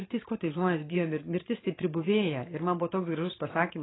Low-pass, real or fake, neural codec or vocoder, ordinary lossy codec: 7.2 kHz; fake; vocoder, 44.1 kHz, 128 mel bands every 512 samples, BigVGAN v2; AAC, 16 kbps